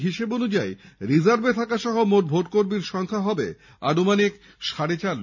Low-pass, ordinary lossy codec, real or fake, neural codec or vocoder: 7.2 kHz; none; real; none